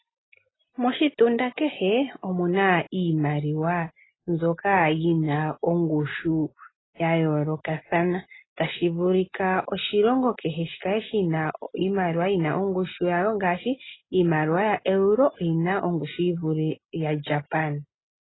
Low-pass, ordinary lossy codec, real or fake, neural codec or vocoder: 7.2 kHz; AAC, 16 kbps; real; none